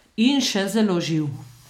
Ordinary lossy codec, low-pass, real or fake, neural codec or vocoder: none; 19.8 kHz; real; none